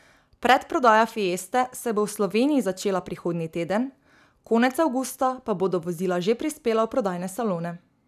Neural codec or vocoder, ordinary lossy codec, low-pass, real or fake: none; none; 14.4 kHz; real